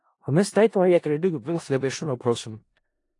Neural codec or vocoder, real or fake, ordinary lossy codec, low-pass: codec, 16 kHz in and 24 kHz out, 0.4 kbps, LongCat-Audio-Codec, four codebook decoder; fake; AAC, 48 kbps; 10.8 kHz